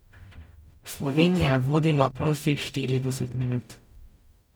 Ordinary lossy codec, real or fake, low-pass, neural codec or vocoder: none; fake; none; codec, 44.1 kHz, 0.9 kbps, DAC